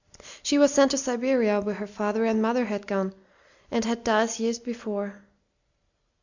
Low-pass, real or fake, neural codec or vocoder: 7.2 kHz; real; none